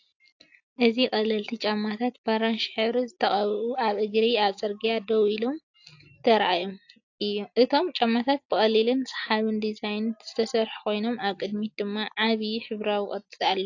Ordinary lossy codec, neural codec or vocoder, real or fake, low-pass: AAC, 48 kbps; none; real; 7.2 kHz